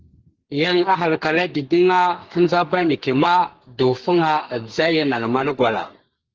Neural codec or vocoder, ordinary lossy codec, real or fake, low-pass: codec, 32 kHz, 1.9 kbps, SNAC; Opus, 16 kbps; fake; 7.2 kHz